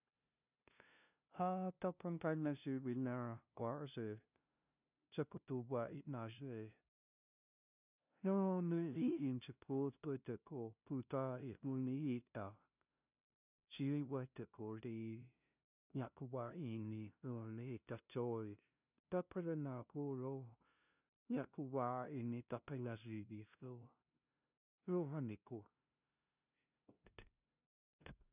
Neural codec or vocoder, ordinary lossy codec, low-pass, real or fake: codec, 16 kHz, 0.5 kbps, FunCodec, trained on LibriTTS, 25 frames a second; none; 3.6 kHz; fake